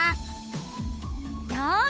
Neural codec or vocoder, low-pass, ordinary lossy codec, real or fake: codec, 16 kHz, 8 kbps, FunCodec, trained on Chinese and English, 25 frames a second; none; none; fake